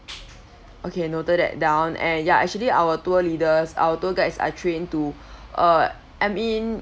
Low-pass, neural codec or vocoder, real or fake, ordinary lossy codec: none; none; real; none